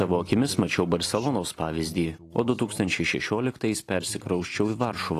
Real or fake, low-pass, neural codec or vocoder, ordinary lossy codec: real; 14.4 kHz; none; AAC, 48 kbps